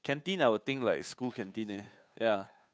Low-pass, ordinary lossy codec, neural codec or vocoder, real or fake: none; none; codec, 16 kHz, 2 kbps, FunCodec, trained on Chinese and English, 25 frames a second; fake